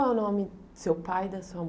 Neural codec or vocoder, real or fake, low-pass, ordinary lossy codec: none; real; none; none